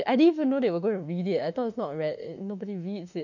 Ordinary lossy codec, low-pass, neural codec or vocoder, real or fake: none; 7.2 kHz; autoencoder, 48 kHz, 32 numbers a frame, DAC-VAE, trained on Japanese speech; fake